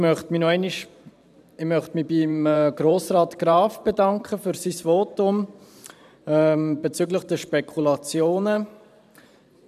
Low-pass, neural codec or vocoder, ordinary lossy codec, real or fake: 14.4 kHz; vocoder, 44.1 kHz, 128 mel bands every 512 samples, BigVGAN v2; none; fake